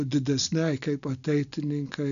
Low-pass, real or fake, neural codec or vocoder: 7.2 kHz; real; none